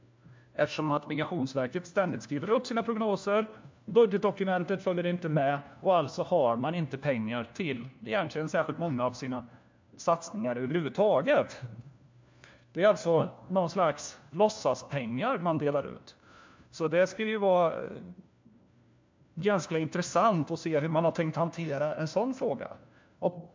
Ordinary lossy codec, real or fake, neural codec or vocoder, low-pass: MP3, 64 kbps; fake; codec, 16 kHz, 1 kbps, FunCodec, trained on LibriTTS, 50 frames a second; 7.2 kHz